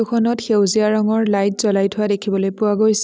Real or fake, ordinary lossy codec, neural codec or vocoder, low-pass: real; none; none; none